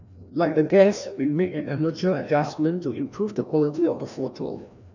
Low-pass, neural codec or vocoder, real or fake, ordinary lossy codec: 7.2 kHz; codec, 16 kHz, 1 kbps, FreqCodec, larger model; fake; none